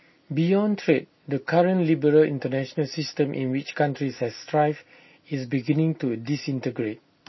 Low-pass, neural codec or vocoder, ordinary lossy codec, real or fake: 7.2 kHz; none; MP3, 24 kbps; real